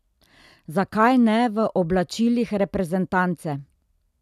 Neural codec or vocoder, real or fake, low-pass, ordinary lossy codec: none; real; 14.4 kHz; none